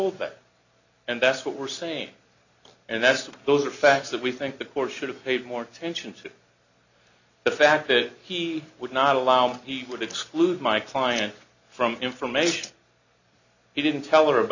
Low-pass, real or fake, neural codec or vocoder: 7.2 kHz; real; none